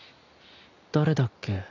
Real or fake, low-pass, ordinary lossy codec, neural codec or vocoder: real; 7.2 kHz; none; none